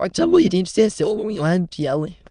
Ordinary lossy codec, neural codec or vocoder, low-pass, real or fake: none; autoencoder, 22.05 kHz, a latent of 192 numbers a frame, VITS, trained on many speakers; 9.9 kHz; fake